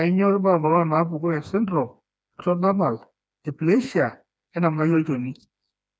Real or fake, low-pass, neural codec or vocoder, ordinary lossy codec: fake; none; codec, 16 kHz, 2 kbps, FreqCodec, smaller model; none